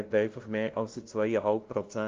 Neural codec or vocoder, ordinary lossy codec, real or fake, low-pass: codec, 16 kHz, 0.5 kbps, FunCodec, trained on LibriTTS, 25 frames a second; Opus, 32 kbps; fake; 7.2 kHz